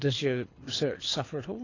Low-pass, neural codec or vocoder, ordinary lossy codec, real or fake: 7.2 kHz; codec, 24 kHz, 6 kbps, HILCodec; AAC, 32 kbps; fake